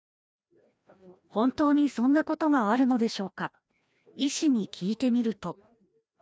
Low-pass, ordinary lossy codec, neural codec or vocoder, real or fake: none; none; codec, 16 kHz, 1 kbps, FreqCodec, larger model; fake